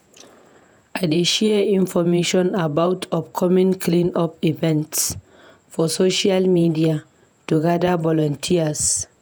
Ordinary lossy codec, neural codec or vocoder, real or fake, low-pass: none; vocoder, 48 kHz, 128 mel bands, Vocos; fake; none